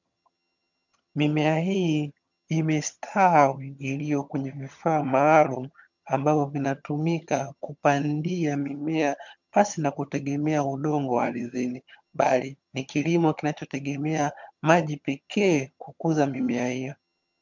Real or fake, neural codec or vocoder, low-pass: fake; vocoder, 22.05 kHz, 80 mel bands, HiFi-GAN; 7.2 kHz